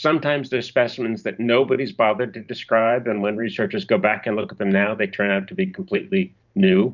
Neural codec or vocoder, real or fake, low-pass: vocoder, 44.1 kHz, 128 mel bands every 512 samples, BigVGAN v2; fake; 7.2 kHz